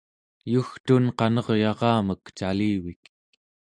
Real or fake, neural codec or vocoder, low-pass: real; none; 9.9 kHz